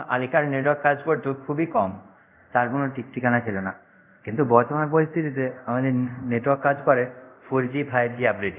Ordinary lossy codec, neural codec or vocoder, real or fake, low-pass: none; codec, 24 kHz, 0.5 kbps, DualCodec; fake; 3.6 kHz